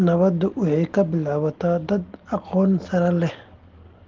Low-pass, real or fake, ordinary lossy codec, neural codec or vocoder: 7.2 kHz; real; Opus, 16 kbps; none